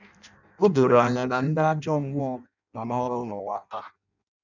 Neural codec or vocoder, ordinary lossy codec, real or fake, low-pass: codec, 16 kHz in and 24 kHz out, 0.6 kbps, FireRedTTS-2 codec; none; fake; 7.2 kHz